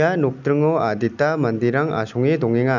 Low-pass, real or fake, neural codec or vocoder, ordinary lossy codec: 7.2 kHz; real; none; none